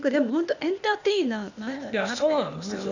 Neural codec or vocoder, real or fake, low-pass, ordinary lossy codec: codec, 16 kHz, 0.8 kbps, ZipCodec; fake; 7.2 kHz; none